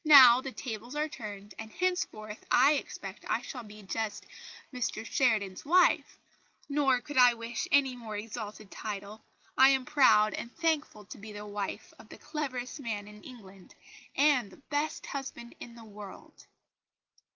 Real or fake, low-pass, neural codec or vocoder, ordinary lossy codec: real; 7.2 kHz; none; Opus, 24 kbps